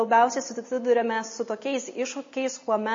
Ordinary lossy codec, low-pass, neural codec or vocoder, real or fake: MP3, 32 kbps; 7.2 kHz; none; real